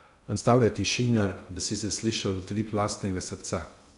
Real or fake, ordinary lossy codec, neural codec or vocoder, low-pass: fake; none; codec, 16 kHz in and 24 kHz out, 0.6 kbps, FocalCodec, streaming, 2048 codes; 10.8 kHz